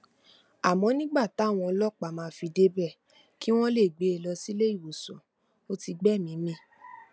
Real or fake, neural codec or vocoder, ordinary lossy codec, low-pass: real; none; none; none